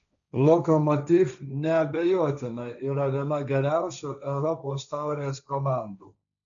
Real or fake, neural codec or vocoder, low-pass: fake; codec, 16 kHz, 1.1 kbps, Voila-Tokenizer; 7.2 kHz